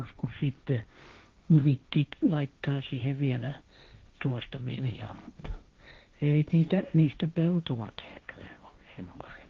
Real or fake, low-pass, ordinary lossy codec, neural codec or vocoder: fake; 7.2 kHz; Opus, 32 kbps; codec, 16 kHz, 1.1 kbps, Voila-Tokenizer